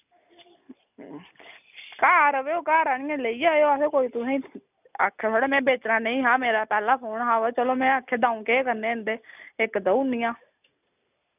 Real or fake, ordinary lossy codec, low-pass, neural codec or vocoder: real; none; 3.6 kHz; none